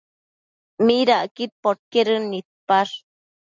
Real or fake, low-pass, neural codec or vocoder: real; 7.2 kHz; none